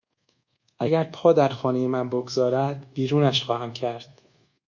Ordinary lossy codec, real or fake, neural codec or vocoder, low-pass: Opus, 64 kbps; fake; codec, 24 kHz, 1.2 kbps, DualCodec; 7.2 kHz